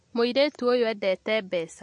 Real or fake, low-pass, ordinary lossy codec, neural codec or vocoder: real; 10.8 kHz; MP3, 48 kbps; none